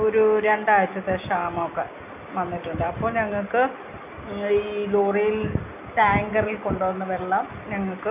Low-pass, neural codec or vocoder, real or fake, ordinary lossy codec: 3.6 kHz; none; real; none